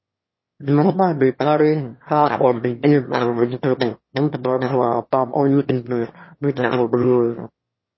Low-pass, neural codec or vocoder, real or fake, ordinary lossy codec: 7.2 kHz; autoencoder, 22.05 kHz, a latent of 192 numbers a frame, VITS, trained on one speaker; fake; MP3, 24 kbps